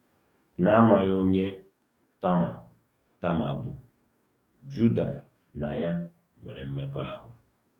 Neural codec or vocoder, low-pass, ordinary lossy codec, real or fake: codec, 44.1 kHz, 2.6 kbps, DAC; 19.8 kHz; none; fake